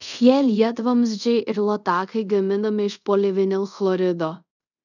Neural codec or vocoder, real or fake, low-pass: codec, 24 kHz, 0.5 kbps, DualCodec; fake; 7.2 kHz